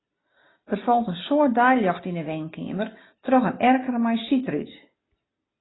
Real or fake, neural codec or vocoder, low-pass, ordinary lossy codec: real; none; 7.2 kHz; AAC, 16 kbps